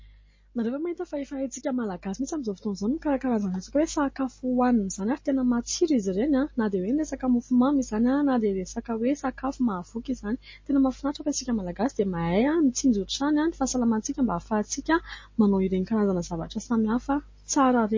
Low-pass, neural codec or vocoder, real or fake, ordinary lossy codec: 7.2 kHz; none; real; MP3, 32 kbps